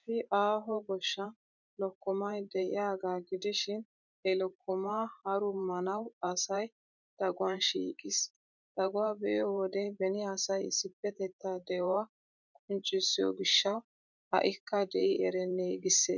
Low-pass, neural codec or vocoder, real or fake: 7.2 kHz; vocoder, 44.1 kHz, 128 mel bands every 512 samples, BigVGAN v2; fake